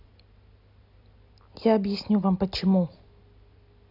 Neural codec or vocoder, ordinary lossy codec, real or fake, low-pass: none; none; real; 5.4 kHz